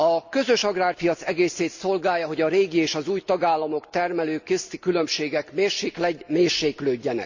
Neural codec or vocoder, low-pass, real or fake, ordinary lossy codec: none; 7.2 kHz; real; Opus, 64 kbps